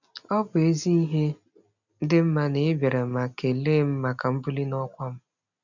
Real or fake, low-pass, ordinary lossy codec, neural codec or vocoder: real; 7.2 kHz; none; none